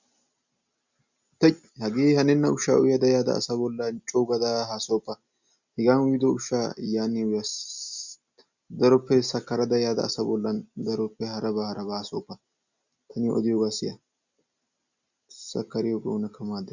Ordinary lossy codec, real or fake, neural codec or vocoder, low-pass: Opus, 64 kbps; real; none; 7.2 kHz